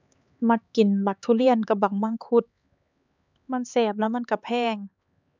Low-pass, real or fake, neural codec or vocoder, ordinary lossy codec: 7.2 kHz; fake; codec, 16 kHz, 4 kbps, X-Codec, HuBERT features, trained on LibriSpeech; none